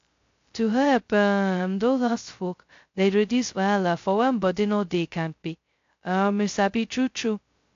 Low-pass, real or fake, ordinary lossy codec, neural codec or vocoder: 7.2 kHz; fake; AAC, 48 kbps; codec, 16 kHz, 0.2 kbps, FocalCodec